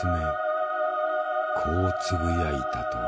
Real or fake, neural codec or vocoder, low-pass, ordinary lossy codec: real; none; none; none